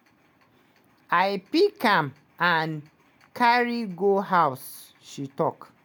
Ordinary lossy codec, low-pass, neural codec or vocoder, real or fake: none; none; none; real